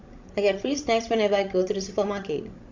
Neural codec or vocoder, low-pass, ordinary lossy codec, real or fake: codec, 16 kHz, 16 kbps, FreqCodec, larger model; 7.2 kHz; MP3, 64 kbps; fake